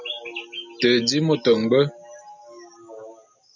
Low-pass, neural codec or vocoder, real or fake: 7.2 kHz; none; real